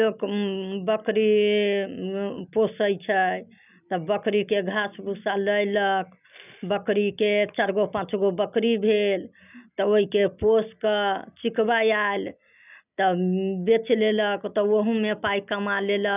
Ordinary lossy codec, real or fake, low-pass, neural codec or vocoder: none; real; 3.6 kHz; none